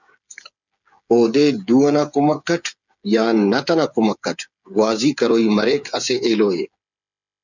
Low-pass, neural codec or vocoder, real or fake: 7.2 kHz; codec, 16 kHz, 8 kbps, FreqCodec, smaller model; fake